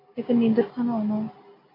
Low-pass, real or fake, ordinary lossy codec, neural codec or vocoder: 5.4 kHz; real; AAC, 24 kbps; none